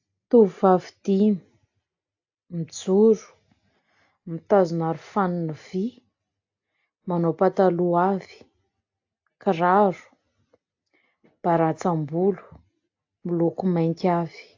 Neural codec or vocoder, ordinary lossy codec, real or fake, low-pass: none; AAC, 48 kbps; real; 7.2 kHz